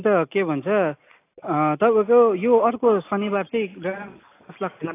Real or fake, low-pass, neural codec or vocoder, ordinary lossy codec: real; 3.6 kHz; none; AAC, 24 kbps